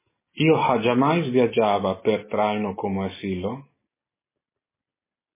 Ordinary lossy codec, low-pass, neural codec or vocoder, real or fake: MP3, 16 kbps; 3.6 kHz; none; real